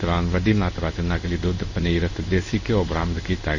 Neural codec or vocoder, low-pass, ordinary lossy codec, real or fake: codec, 16 kHz in and 24 kHz out, 1 kbps, XY-Tokenizer; 7.2 kHz; none; fake